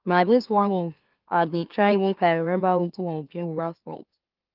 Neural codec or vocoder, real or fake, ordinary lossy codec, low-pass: autoencoder, 44.1 kHz, a latent of 192 numbers a frame, MeloTTS; fake; Opus, 32 kbps; 5.4 kHz